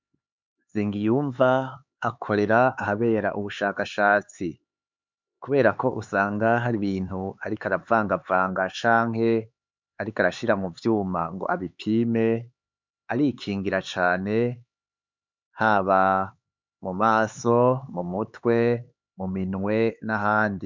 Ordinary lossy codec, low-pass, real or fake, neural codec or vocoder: MP3, 64 kbps; 7.2 kHz; fake; codec, 16 kHz, 4 kbps, X-Codec, HuBERT features, trained on LibriSpeech